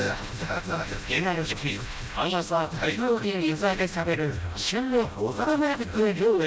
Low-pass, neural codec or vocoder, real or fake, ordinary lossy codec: none; codec, 16 kHz, 0.5 kbps, FreqCodec, smaller model; fake; none